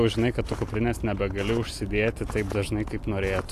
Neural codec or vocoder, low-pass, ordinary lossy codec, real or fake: none; 14.4 kHz; AAC, 64 kbps; real